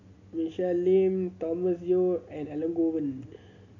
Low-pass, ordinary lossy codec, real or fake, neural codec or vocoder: 7.2 kHz; none; real; none